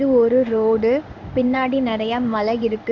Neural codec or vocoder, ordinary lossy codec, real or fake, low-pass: codec, 16 kHz in and 24 kHz out, 1 kbps, XY-Tokenizer; none; fake; 7.2 kHz